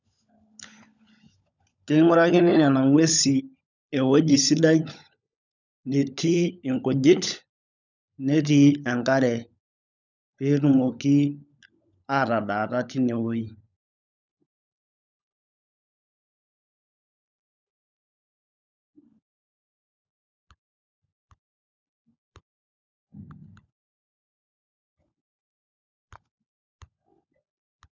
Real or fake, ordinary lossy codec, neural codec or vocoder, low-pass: fake; none; codec, 16 kHz, 16 kbps, FunCodec, trained on LibriTTS, 50 frames a second; 7.2 kHz